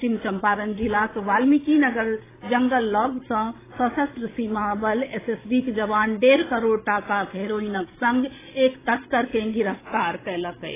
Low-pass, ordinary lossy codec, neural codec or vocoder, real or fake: 3.6 kHz; AAC, 16 kbps; codec, 16 kHz, 16 kbps, FreqCodec, larger model; fake